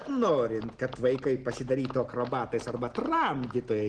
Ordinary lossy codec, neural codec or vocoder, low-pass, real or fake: Opus, 16 kbps; none; 10.8 kHz; real